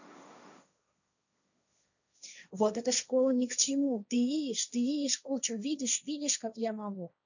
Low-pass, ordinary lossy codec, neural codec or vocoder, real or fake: 7.2 kHz; none; codec, 16 kHz, 1.1 kbps, Voila-Tokenizer; fake